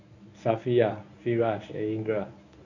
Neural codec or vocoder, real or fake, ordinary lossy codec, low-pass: codec, 24 kHz, 0.9 kbps, WavTokenizer, medium speech release version 1; fake; none; 7.2 kHz